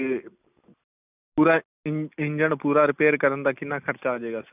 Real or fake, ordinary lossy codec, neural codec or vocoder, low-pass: real; none; none; 3.6 kHz